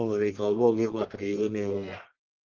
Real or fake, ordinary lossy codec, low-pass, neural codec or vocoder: fake; Opus, 16 kbps; 7.2 kHz; codec, 44.1 kHz, 1.7 kbps, Pupu-Codec